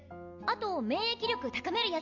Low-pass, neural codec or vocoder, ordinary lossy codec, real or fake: 7.2 kHz; none; MP3, 48 kbps; real